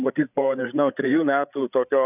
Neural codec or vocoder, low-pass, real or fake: codec, 16 kHz, 8 kbps, FreqCodec, larger model; 3.6 kHz; fake